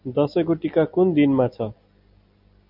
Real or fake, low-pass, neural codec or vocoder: real; 5.4 kHz; none